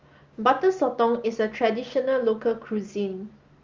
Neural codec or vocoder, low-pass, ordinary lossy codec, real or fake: none; 7.2 kHz; Opus, 32 kbps; real